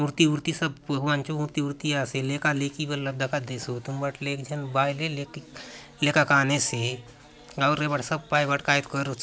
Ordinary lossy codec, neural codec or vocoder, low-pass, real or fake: none; none; none; real